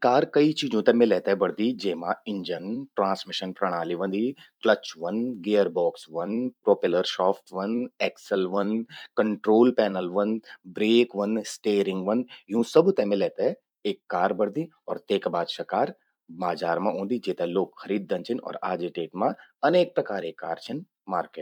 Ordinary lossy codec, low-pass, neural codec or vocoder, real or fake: none; 19.8 kHz; vocoder, 44.1 kHz, 128 mel bands every 256 samples, BigVGAN v2; fake